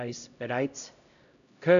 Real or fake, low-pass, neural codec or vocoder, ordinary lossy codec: fake; 7.2 kHz; codec, 16 kHz, 0.5 kbps, X-Codec, HuBERT features, trained on LibriSpeech; none